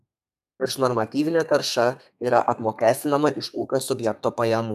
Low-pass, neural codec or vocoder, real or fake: 14.4 kHz; codec, 32 kHz, 1.9 kbps, SNAC; fake